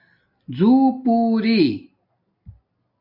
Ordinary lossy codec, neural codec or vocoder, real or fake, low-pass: MP3, 48 kbps; none; real; 5.4 kHz